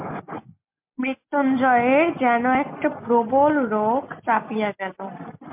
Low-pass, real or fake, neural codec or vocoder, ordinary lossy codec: 3.6 kHz; real; none; MP3, 32 kbps